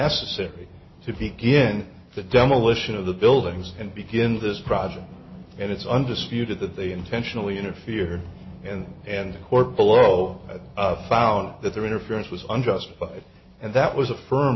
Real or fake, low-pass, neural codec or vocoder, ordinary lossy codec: real; 7.2 kHz; none; MP3, 24 kbps